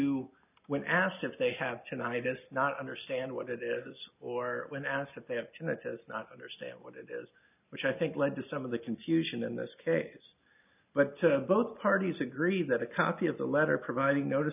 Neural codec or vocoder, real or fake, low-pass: none; real; 3.6 kHz